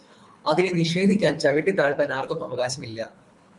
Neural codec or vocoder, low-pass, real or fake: codec, 24 kHz, 3 kbps, HILCodec; 10.8 kHz; fake